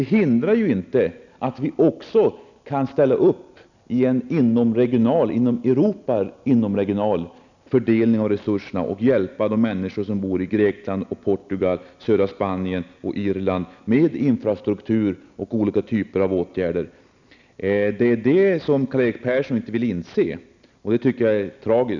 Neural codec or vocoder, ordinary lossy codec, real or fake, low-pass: none; none; real; 7.2 kHz